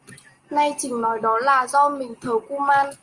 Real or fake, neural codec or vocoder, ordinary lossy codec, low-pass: real; none; Opus, 32 kbps; 10.8 kHz